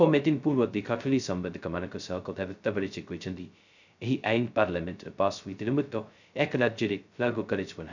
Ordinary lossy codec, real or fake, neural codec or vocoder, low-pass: none; fake; codec, 16 kHz, 0.2 kbps, FocalCodec; 7.2 kHz